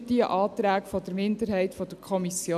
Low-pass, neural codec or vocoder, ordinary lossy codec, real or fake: 14.4 kHz; none; none; real